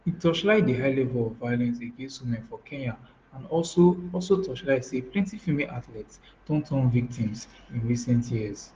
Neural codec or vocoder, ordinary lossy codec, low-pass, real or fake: none; Opus, 16 kbps; 7.2 kHz; real